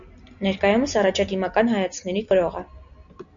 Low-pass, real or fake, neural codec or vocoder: 7.2 kHz; real; none